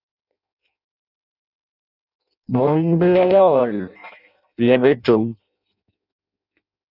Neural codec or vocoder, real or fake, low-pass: codec, 16 kHz in and 24 kHz out, 0.6 kbps, FireRedTTS-2 codec; fake; 5.4 kHz